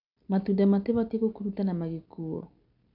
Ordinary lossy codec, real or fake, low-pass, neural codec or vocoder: none; real; 5.4 kHz; none